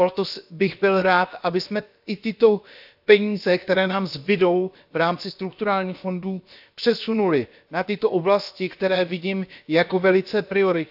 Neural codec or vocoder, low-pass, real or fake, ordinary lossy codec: codec, 16 kHz, about 1 kbps, DyCAST, with the encoder's durations; 5.4 kHz; fake; AAC, 48 kbps